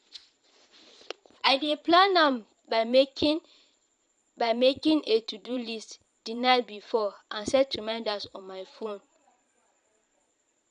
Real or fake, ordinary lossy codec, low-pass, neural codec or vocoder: fake; none; 9.9 kHz; vocoder, 22.05 kHz, 80 mel bands, Vocos